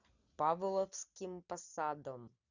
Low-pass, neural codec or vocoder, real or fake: 7.2 kHz; none; real